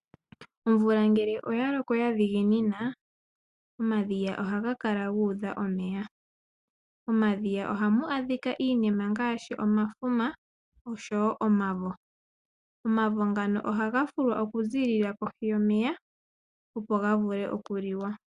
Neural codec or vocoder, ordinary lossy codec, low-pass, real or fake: none; Opus, 64 kbps; 10.8 kHz; real